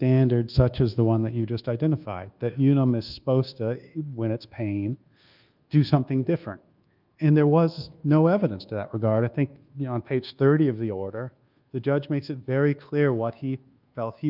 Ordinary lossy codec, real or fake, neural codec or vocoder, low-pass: Opus, 24 kbps; fake; codec, 24 kHz, 1.2 kbps, DualCodec; 5.4 kHz